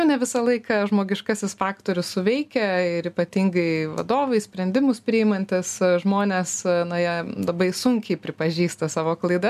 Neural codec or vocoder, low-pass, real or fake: none; 14.4 kHz; real